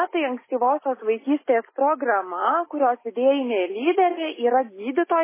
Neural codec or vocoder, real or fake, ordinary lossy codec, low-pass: none; real; MP3, 16 kbps; 3.6 kHz